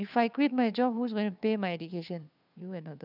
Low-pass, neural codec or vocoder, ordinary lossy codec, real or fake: 5.4 kHz; codec, 16 kHz, 2 kbps, FunCodec, trained on Chinese and English, 25 frames a second; none; fake